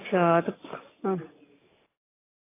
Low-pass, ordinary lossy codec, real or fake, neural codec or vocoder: 3.6 kHz; AAC, 16 kbps; real; none